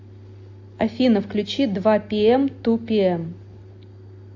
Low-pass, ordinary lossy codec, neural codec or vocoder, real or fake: 7.2 kHz; AAC, 48 kbps; none; real